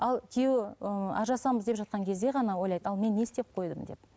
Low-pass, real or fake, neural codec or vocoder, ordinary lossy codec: none; real; none; none